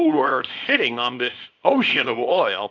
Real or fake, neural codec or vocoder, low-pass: fake; codec, 24 kHz, 0.9 kbps, WavTokenizer, small release; 7.2 kHz